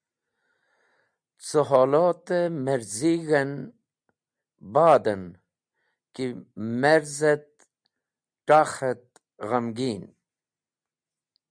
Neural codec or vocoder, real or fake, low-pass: none; real; 9.9 kHz